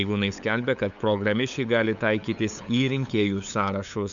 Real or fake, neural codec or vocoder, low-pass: fake; codec, 16 kHz, 8 kbps, FunCodec, trained on LibriTTS, 25 frames a second; 7.2 kHz